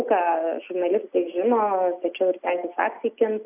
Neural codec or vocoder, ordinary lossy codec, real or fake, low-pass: none; AAC, 32 kbps; real; 3.6 kHz